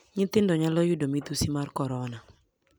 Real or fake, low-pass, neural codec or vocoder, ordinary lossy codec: real; none; none; none